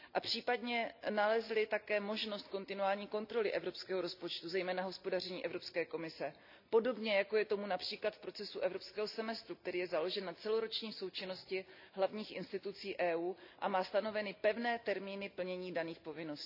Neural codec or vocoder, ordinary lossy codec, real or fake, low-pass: none; none; real; 5.4 kHz